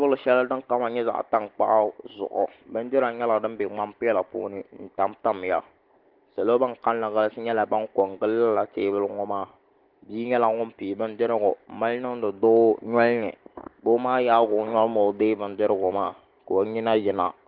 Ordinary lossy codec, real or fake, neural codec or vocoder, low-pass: Opus, 16 kbps; real; none; 5.4 kHz